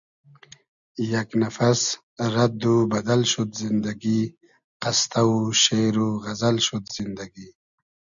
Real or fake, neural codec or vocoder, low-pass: real; none; 7.2 kHz